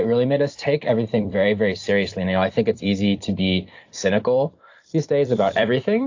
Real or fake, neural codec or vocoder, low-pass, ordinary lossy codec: fake; vocoder, 44.1 kHz, 128 mel bands every 256 samples, BigVGAN v2; 7.2 kHz; AAC, 48 kbps